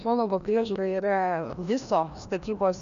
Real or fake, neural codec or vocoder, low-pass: fake; codec, 16 kHz, 1 kbps, FreqCodec, larger model; 7.2 kHz